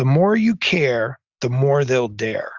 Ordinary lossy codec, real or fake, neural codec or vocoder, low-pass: Opus, 64 kbps; real; none; 7.2 kHz